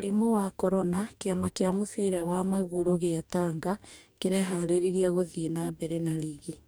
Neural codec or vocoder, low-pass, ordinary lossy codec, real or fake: codec, 44.1 kHz, 2.6 kbps, DAC; none; none; fake